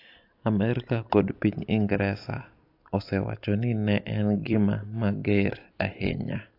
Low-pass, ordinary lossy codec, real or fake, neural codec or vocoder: 5.4 kHz; AAC, 32 kbps; fake; vocoder, 44.1 kHz, 128 mel bands every 256 samples, BigVGAN v2